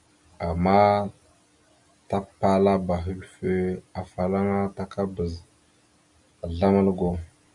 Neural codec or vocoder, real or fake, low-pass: none; real; 10.8 kHz